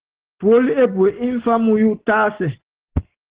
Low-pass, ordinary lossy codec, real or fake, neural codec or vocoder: 3.6 kHz; Opus, 16 kbps; real; none